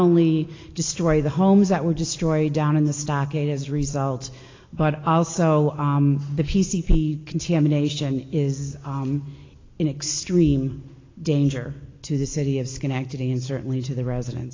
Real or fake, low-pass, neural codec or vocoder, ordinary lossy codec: real; 7.2 kHz; none; AAC, 32 kbps